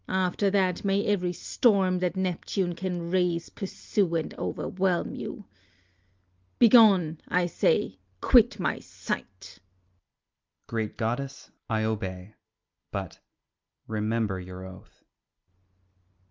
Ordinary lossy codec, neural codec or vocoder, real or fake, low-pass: Opus, 24 kbps; none; real; 7.2 kHz